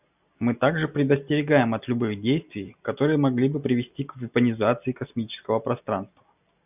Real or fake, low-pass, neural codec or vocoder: real; 3.6 kHz; none